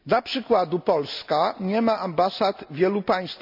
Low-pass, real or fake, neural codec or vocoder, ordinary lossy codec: 5.4 kHz; real; none; none